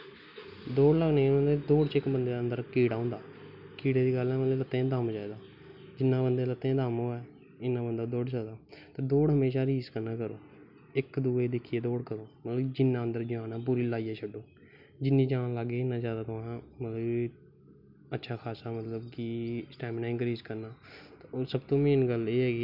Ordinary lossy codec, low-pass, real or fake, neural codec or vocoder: none; 5.4 kHz; real; none